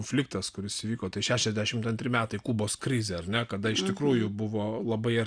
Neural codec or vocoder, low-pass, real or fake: none; 9.9 kHz; real